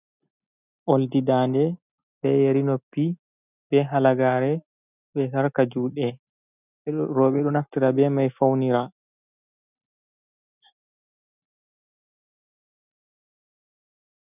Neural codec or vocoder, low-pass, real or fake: none; 3.6 kHz; real